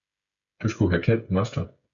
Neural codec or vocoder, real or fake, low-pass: codec, 16 kHz, 16 kbps, FreqCodec, smaller model; fake; 7.2 kHz